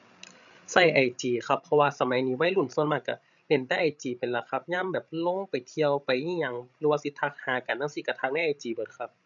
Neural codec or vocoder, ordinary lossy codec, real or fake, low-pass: codec, 16 kHz, 16 kbps, FreqCodec, larger model; none; fake; 7.2 kHz